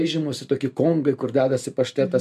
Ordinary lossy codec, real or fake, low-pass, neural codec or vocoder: MP3, 64 kbps; real; 14.4 kHz; none